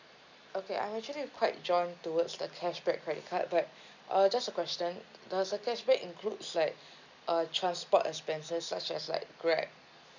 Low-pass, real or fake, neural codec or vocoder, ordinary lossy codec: 7.2 kHz; real; none; none